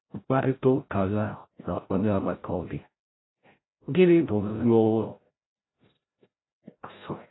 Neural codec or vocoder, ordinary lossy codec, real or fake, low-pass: codec, 16 kHz, 0.5 kbps, FreqCodec, larger model; AAC, 16 kbps; fake; 7.2 kHz